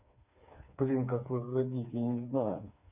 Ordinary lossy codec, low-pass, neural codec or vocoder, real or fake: none; 3.6 kHz; codec, 16 kHz, 4 kbps, FreqCodec, smaller model; fake